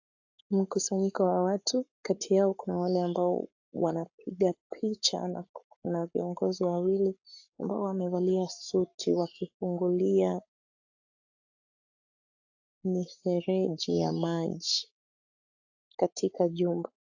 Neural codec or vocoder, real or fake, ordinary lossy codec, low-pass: codec, 44.1 kHz, 7.8 kbps, Pupu-Codec; fake; AAC, 48 kbps; 7.2 kHz